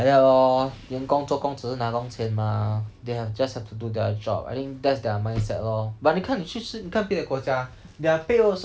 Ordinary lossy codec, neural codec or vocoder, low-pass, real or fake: none; none; none; real